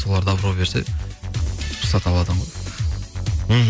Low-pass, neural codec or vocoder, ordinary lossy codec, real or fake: none; none; none; real